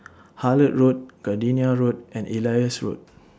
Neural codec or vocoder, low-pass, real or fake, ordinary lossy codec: none; none; real; none